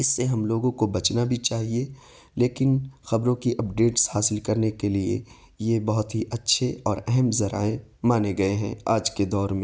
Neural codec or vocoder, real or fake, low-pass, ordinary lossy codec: none; real; none; none